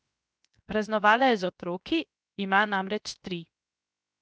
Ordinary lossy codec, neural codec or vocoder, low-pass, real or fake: none; codec, 16 kHz, 0.7 kbps, FocalCodec; none; fake